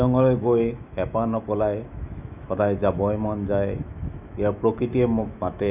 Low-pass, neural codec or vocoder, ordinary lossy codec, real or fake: 3.6 kHz; autoencoder, 48 kHz, 128 numbers a frame, DAC-VAE, trained on Japanese speech; none; fake